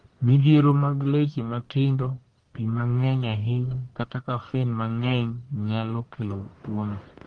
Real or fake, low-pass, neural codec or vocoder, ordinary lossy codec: fake; 9.9 kHz; codec, 44.1 kHz, 1.7 kbps, Pupu-Codec; Opus, 24 kbps